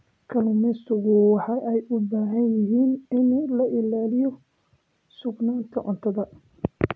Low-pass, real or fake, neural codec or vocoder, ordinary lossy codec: none; real; none; none